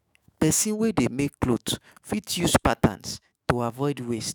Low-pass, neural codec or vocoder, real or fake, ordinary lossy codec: none; autoencoder, 48 kHz, 128 numbers a frame, DAC-VAE, trained on Japanese speech; fake; none